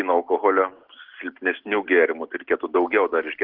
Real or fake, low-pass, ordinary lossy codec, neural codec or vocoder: real; 5.4 kHz; Opus, 16 kbps; none